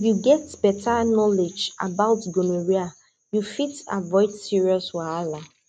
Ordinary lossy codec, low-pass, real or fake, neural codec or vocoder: none; 9.9 kHz; real; none